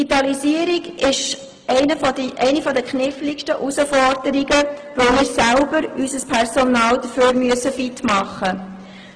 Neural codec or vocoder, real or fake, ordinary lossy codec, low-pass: none; real; Opus, 16 kbps; 9.9 kHz